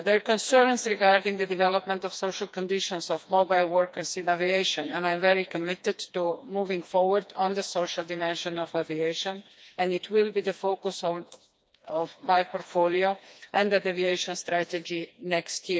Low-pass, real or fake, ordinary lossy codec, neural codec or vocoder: none; fake; none; codec, 16 kHz, 2 kbps, FreqCodec, smaller model